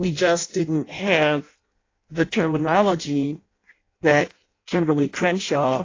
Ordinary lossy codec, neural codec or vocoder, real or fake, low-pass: AAC, 32 kbps; codec, 16 kHz in and 24 kHz out, 0.6 kbps, FireRedTTS-2 codec; fake; 7.2 kHz